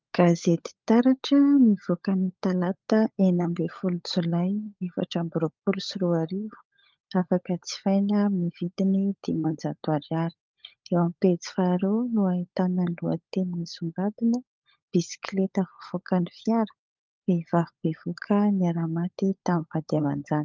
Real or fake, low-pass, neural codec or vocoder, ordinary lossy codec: fake; 7.2 kHz; codec, 16 kHz, 16 kbps, FunCodec, trained on LibriTTS, 50 frames a second; Opus, 24 kbps